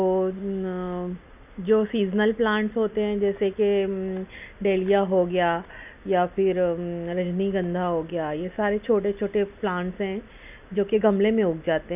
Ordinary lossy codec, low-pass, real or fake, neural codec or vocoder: none; 3.6 kHz; real; none